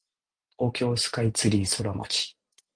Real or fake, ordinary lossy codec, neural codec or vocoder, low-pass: real; Opus, 32 kbps; none; 9.9 kHz